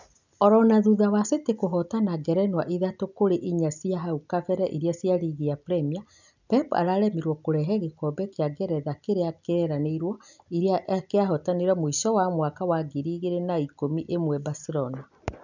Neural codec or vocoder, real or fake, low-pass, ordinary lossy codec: none; real; 7.2 kHz; none